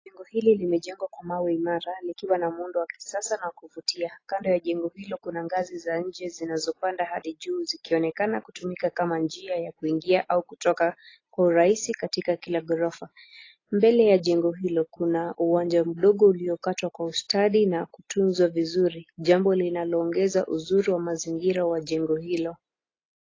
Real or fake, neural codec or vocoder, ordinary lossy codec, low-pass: real; none; AAC, 32 kbps; 7.2 kHz